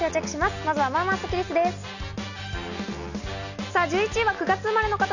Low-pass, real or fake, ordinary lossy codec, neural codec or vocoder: 7.2 kHz; real; none; none